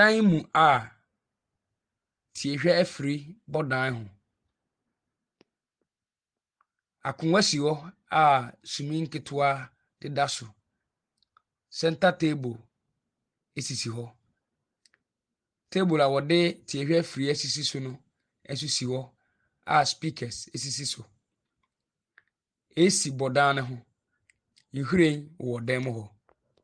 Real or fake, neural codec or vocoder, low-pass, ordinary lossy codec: real; none; 9.9 kHz; Opus, 24 kbps